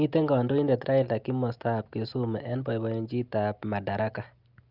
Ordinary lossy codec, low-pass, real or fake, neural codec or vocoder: Opus, 32 kbps; 5.4 kHz; real; none